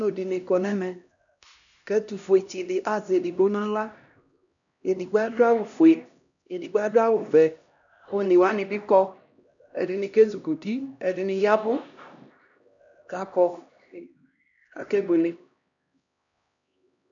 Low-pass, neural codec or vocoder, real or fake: 7.2 kHz; codec, 16 kHz, 1 kbps, X-Codec, HuBERT features, trained on LibriSpeech; fake